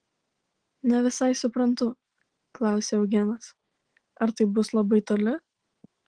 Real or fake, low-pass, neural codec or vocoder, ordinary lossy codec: fake; 9.9 kHz; autoencoder, 48 kHz, 128 numbers a frame, DAC-VAE, trained on Japanese speech; Opus, 16 kbps